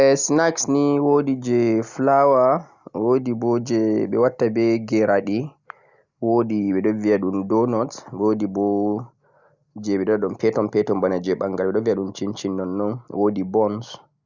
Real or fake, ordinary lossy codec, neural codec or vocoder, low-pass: real; Opus, 64 kbps; none; 7.2 kHz